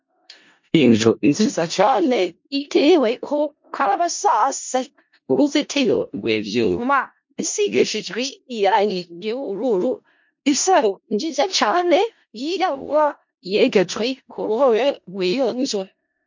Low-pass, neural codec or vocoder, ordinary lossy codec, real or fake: 7.2 kHz; codec, 16 kHz in and 24 kHz out, 0.4 kbps, LongCat-Audio-Codec, four codebook decoder; MP3, 48 kbps; fake